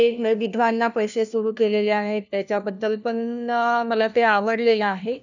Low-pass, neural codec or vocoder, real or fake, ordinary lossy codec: 7.2 kHz; codec, 16 kHz, 1 kbps, FunCodec, trained on LibriTTS, 50 frames a second; fake; none